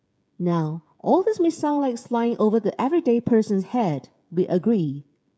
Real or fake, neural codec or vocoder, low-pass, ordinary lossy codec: fake; codec, 16 kHz, 16 kbps, FreqCodec, smaller model; none; none